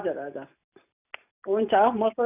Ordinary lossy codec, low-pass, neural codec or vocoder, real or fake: none; 3.6 kHz; none; real